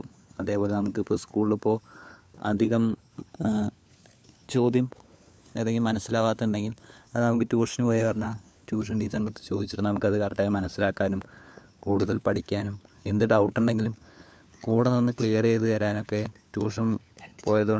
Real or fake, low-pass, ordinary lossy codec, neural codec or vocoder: fake; none; none; codec, 16 kHz, 4 kbps, FunCodec, trained on LibriTTS, 50 frames a second